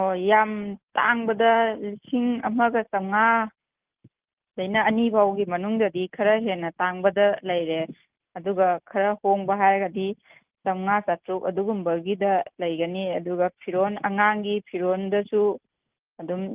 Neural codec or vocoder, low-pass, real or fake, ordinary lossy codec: none; 3.6 kHz; real; Opus, 32 kbps